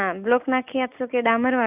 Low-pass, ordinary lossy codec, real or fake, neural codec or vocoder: 3.6 kHz; none; real; none